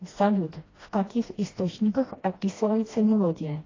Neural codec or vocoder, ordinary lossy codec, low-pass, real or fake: codec, 16 kHz, 1 kbps, FreqCodec, smaller model; AAC, 32 kbps; 7.2 kHz; fake